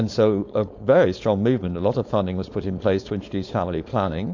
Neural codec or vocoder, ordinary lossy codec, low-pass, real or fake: codec, 16 kHz, 4.8 kbps, FACodec; MP3, 48 kbps; 7.2 kHz; fake